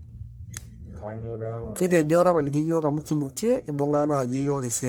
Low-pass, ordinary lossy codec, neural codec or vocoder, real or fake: none; none; codec, 44.1 kHz, 1.7 kbps, Pupu-Codec; fake